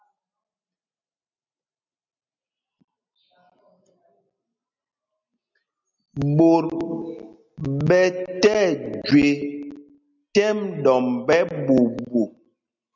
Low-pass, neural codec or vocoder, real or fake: 7.2 kHz; none; real